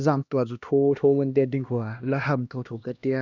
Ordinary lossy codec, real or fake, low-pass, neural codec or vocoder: none; fake; 7.2 kHz; codec, 16 kHz, 1 kbps, X-Codec, HuBERT features, trained on LibriSpeech